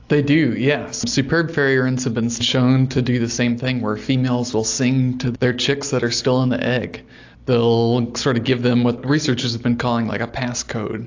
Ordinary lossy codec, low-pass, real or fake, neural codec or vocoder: AAC, 48 kbps; 7.2 kHz; real; none